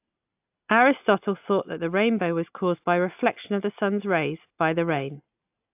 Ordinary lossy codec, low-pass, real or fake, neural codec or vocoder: AAC, 32 kbps; 3.6 kHz; real; none